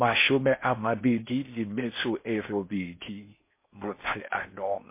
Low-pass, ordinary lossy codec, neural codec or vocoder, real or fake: 3.6 kHz; MP3, 24 kbps; codec, 16 kHz in and 24 kHz out, 0.6 kbps, FocalCodec, streaming, 4096 codes; fake